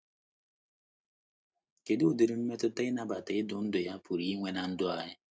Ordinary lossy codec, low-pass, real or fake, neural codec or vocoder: none; none; real; none